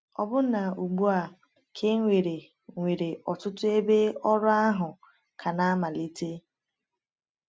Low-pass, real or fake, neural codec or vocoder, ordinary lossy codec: none; real; none; none